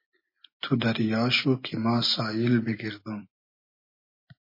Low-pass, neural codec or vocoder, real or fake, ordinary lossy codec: 5.4 kHz; none; real; MP3, 24 kbps